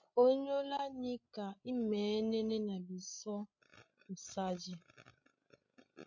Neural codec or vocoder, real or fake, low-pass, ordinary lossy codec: codec, 16 kHz, 16 kbps, FreqCodec, larger model; fake; 7.2 kHz; MP3, 64 kbps